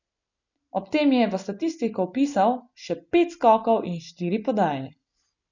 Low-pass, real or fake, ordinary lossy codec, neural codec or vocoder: 7.2 kHz; real; none; none